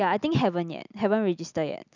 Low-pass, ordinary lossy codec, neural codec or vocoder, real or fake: 7.2 kHz; none; none; real